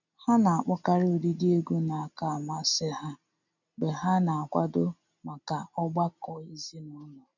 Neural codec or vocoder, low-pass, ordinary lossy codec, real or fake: none; 7.2 kHz; none; real